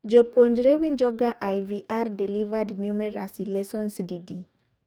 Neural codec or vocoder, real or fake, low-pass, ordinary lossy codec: codec, 44.1 kHz, 2.6 kbps, DAC; fake; none; none